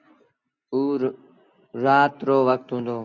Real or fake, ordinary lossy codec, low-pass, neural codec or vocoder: real; Opus, 64 kbps; 7.2 kHz; none